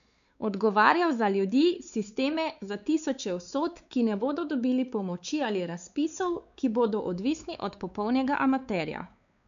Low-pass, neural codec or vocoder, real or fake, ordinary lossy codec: 7.2 kHz; codec, 16 kHz, 4 kbps, X-Codec, WavLM features, trained on Multilingual LibriSpeech; fake; none